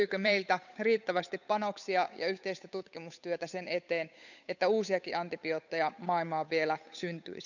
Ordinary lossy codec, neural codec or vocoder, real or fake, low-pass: none; codec, 16 kHz, 8 kbps, FunCodec, trained on Chinese and English, 25 frames a second; fake; 7.2 kHz